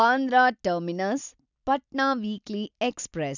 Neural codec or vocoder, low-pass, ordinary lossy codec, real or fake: none; 7.2 kHz; none; real